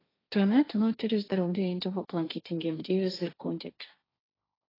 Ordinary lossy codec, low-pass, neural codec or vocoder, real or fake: AAC, 24 kbps; 5.4 kHz; codec, 16 kHz, 1.1 kbps, Voila-Tokenizer; fake